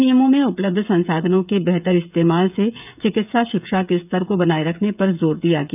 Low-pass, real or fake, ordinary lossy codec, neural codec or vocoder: 3.6 kHz; fake; none; codec, 16 kHz, 16 kbps, FreqCodec, smaller model